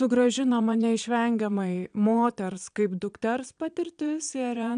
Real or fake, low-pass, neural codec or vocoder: fake; 9.9 kHz; vocoder, 22.05 kHz, 80 mel bands, Vocos